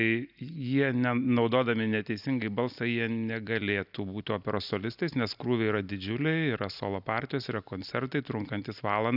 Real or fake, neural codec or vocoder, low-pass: real; none; 5.4 kHz